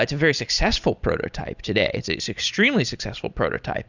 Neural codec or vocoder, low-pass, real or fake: none; 7.2 kHz; real